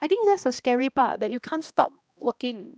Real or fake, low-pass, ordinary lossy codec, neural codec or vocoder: fake; none; none; codec, 16 kHz, 1 kbps, X-Codec, HuBERT features, trained on balanced general audio